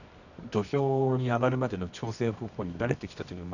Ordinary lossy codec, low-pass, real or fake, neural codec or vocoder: none; 7.2 kHz; fake; codec, 24 kHz, 0.9 kbps, WavTokenizer, medium music audio release